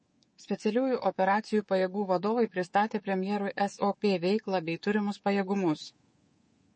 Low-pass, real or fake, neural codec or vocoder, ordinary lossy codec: 9.9 kHz; fake; codec, 24 kHz, 3.1 kbps, DualCodec; MP3, 32 kbps